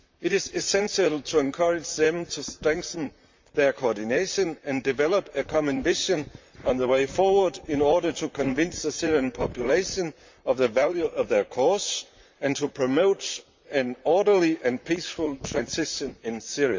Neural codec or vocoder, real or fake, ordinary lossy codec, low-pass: vocoder, 44.1 kHz, 128 mel bands, Pupu-Vocoder; fake; MP3, 64 kbps; 7.2 kHz